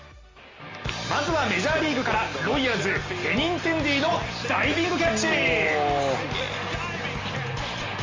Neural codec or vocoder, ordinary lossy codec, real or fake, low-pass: none; Opus, 32 kbps; real; 7.2 kHz